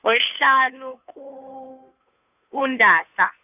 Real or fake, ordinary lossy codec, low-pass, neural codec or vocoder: fake; none; 3.6 kHz; codec, 16 kHz, 2 kbps, FunCodec, trained on Chinese and English, 25 frames a second